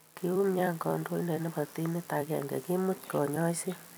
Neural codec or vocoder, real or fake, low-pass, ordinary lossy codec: vocoder, 44.1 kHz, 128 mel bands every 256 samples, BigVGAN v2; fake; none; none